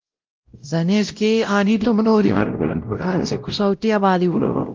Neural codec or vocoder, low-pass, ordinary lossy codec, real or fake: codec, 16 kHz, 0.5 kbps, X-Codec, WavLM features, trained on Multilingual LibriSpeech; 7.2 kHz; Opus, 32 kbps; fake